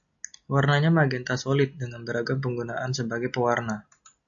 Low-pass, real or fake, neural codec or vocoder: 7.2 kHz; real; none